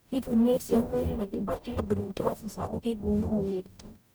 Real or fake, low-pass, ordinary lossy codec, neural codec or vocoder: fake; none; none; codec, 44.1 kHz, 0.9 kbps, DAC